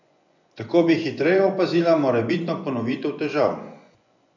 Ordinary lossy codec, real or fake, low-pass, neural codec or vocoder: none; fake; 7.2 kHz; vocoder, 24 kHz, 100 mel bands, Vocos